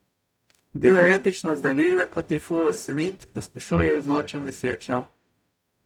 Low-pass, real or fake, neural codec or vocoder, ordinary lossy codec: 19.8 kHz; fake; codec, 44.1 kHz, 0.9 kbps, DAC; none